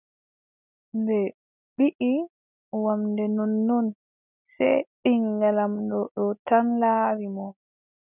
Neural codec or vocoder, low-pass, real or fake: none; 3.6 kHz; real